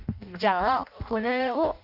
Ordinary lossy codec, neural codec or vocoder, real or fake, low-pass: none; codec, 16 kHz in and 24 kHz out, 0.6 kbps, FireRedTTS-2 codec; fake; 5.4 kHz